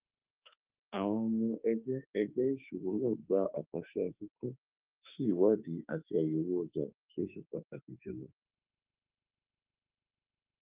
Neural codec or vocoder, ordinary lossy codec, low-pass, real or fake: autoencoder, 48 kHz, 32 numbers a frame, DAC-VAE, trained on Japanese speech; Opus, 24 kbps; 3.6 kHz; fake